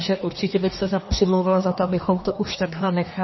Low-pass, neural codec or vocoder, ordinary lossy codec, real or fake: 7.2 kHz; codec, 24 kHz, 1 kbps, SNAC; MP3, 24 kbps; fake